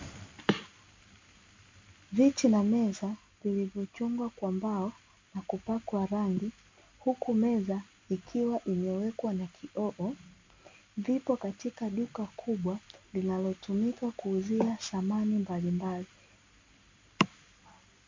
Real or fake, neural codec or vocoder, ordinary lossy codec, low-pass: real; none; MP3, 48 kbps; 7.2 kHz